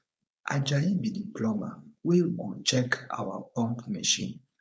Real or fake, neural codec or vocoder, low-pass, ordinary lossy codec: fake; codec, 16 kHz, 4.8 kbps, FACodec; none; none